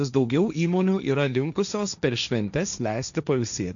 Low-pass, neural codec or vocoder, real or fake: 7.2 kHz; codec, 16 kHz, 1.1 kbps, Voila-Tokenizer; fake